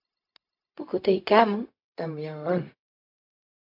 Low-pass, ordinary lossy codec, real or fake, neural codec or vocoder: 5.4 kHz; MP3, 48 kbps; fake; codec, 16 kHz, 0.4 kbps, LongCat-Audio-Codec